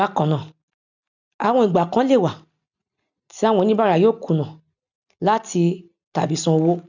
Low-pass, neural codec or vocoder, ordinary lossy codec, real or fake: 7.2 kHz; none; none; real